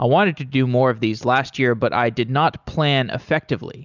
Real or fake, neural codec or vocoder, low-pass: real; none; 7.2 kHz